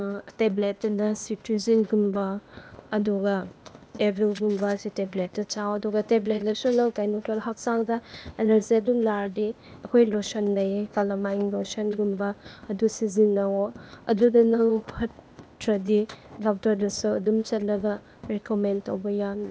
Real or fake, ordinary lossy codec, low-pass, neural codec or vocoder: fake; none; none; codec, 16 kHz, 0.8 kbps, ZipCodec